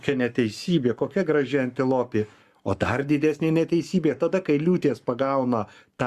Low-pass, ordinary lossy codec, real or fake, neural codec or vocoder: 14.4 kHz; Opus, 64 kbps; fake; codec, 44.1 kHz, 7.8 kbps, Pupu-Codec